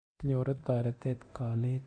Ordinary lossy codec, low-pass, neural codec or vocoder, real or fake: MP3, 48 kbps; 9.9 kHz; codec, 24 kHz, 0.9 kbps, DualCodec; fake